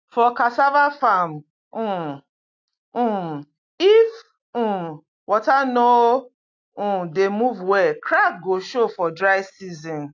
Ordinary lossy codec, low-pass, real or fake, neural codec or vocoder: AAC, 48 kbps; 7.2 kHz; real; none